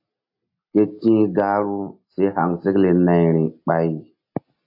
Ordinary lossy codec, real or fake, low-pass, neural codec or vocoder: AAC, 48 kbps; real; 5.4 kHz; none